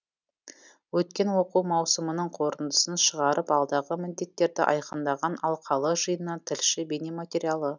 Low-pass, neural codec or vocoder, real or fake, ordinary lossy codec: 7.2 kHz; none; real; none